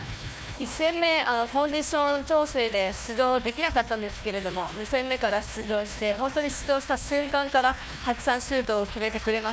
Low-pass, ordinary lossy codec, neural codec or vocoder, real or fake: none; none; codec, 16 kHz, 1 kbps, FunCodec, trained on Chinese and English, 50 frames a second; fake